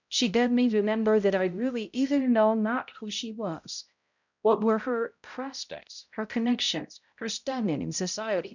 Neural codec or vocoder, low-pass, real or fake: codec, 16 kHz, 0.5 kbps, X-Codec, HuBERT features, trained on balanced general audio; 7.2 kHz; fake